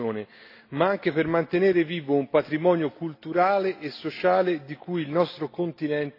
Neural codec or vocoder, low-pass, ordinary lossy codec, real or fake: none; 5.4 kHz; AAC, 32 kbps; real